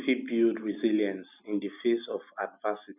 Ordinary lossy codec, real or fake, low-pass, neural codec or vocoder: none; real; 3.6 kHz; none